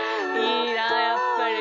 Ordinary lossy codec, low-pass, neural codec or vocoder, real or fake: none; 7.2 kHz; none; real